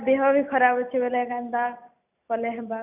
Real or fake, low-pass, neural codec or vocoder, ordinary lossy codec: real; 3.6 kHz; none; none